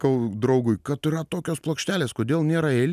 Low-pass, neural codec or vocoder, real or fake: 14.4 kHz; none; real